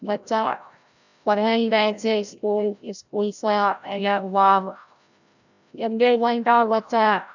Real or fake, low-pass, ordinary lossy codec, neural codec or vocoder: fake; 7.2 kHz; none; codec, 16 kHz, 0.5 kbps, FreqCodec, larger model